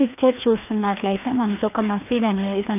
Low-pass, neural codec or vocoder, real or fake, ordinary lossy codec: 3.6 kHz; codec, 16 kHz, 2 kbps, FreqCodec, larger model; fake; none